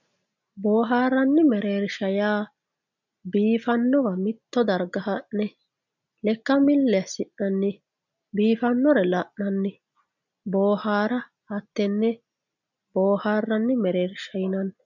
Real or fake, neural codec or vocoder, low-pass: real; none; 7.2 kHz